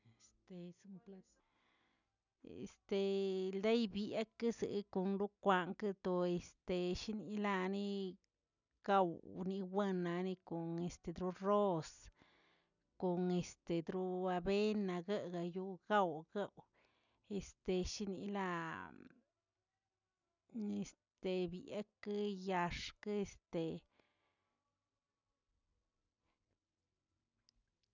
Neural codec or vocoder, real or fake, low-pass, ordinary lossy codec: none; real; 7.2 kHz; none